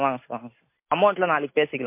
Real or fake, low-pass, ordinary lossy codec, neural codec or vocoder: real; 3.6 kHz; MP3, 24 kbps; none